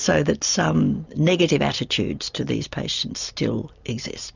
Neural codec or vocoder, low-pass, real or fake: none; 7.2 kHz; real